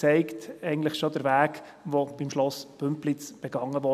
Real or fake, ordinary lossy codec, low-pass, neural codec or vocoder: real; none; 14.4 kHz; none